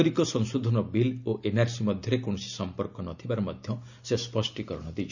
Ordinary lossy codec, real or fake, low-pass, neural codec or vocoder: none; real; 7.2 kHz; none